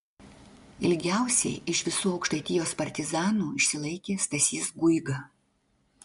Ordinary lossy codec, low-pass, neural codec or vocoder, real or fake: MP3, 64 kbps; 10.8 kHz; none; real